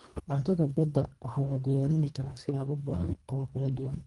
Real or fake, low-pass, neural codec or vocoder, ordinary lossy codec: fake; 10.8 kHz; codec, 24 kHz, 1.5 kbps, HILCodec; Opus, 32 kbps